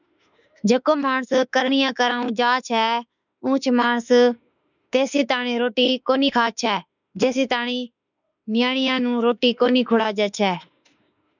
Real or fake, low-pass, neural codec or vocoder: fake; 7.2 kHz; autoencoder, 48 kHz, 32 numbers a frame, DAC-VAE, trained on Japanese speech